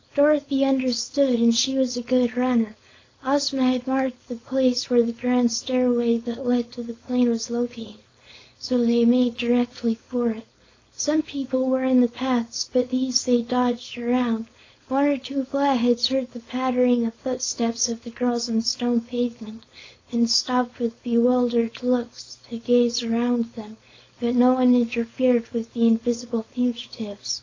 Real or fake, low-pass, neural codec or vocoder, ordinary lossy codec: fake; 7.2 kHz; codec, 16 kHz, 4.8 kbps, FACodec; AAC, 32 kbps